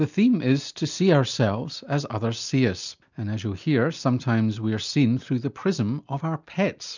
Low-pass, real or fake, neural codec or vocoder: 7.2 kHz; real; none